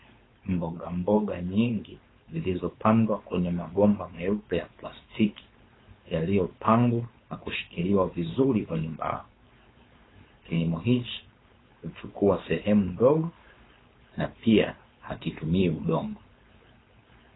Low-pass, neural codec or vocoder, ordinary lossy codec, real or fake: 7.2 kHz; codec, 16 kHz, 4.8 kbps, FACodec; AAC, 16 kbps; fake